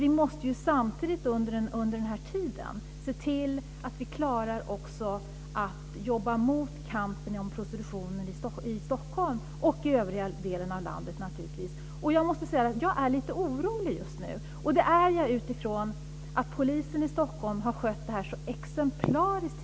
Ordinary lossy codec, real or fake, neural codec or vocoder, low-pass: none; real; none; none